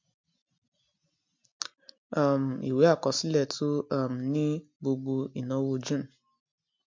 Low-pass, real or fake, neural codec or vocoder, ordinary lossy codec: 7.2 kHz; real; none; MP3, 48 kbps